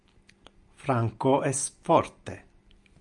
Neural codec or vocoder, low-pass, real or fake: none; 10.8 kHz; real